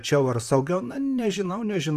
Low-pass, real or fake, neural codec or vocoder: 14.4 kHz; fake; vocoder, 44.1 kHz, 128 mel bands, Pupu-Vocoder